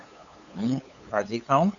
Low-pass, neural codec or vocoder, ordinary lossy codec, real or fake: 7.2 kHz; codec, 16 kHz, 8 kbps, FunCodec, trained on LibriTTS, 25 frames a second; Opus, 64 kbps; fake